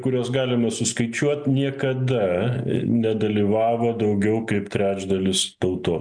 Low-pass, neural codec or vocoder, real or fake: 9.9 kHz; none; real